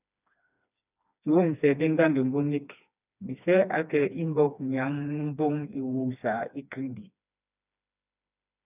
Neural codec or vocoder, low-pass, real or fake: codec, 16 kHz, 2 kbps, FreqCodec, smaller model; 3.6 kHz; fake